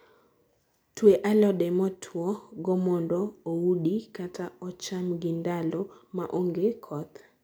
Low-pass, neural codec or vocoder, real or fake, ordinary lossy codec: none; none; real; none